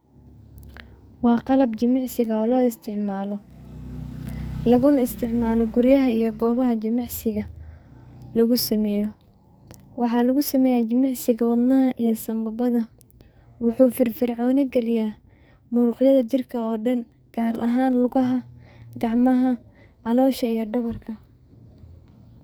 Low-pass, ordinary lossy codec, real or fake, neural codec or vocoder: none; none; fake; codec, 44.1 kHz, 2.6 kbps, SNAC